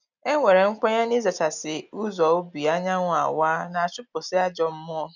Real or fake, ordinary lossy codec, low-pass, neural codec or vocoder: real; none; 7.2 kHz; none